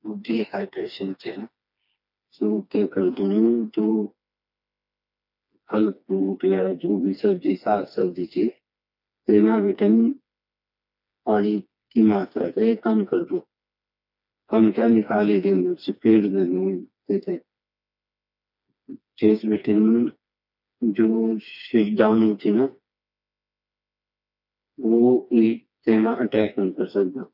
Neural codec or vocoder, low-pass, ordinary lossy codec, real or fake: codec, 16 kHz, 2 kbps, FreqCodec, smaller model; 5.4 kHz; none; fake